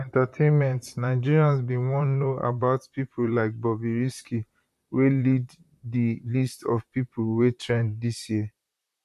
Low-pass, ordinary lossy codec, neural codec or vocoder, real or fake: 14.4 kHz; none; vocoder, 44.1 kHz, 128 mel bands, Pupu-Vocoder; fake